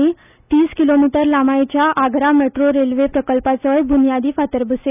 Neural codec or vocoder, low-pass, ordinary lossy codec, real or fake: none; 3.6 kHz; none; real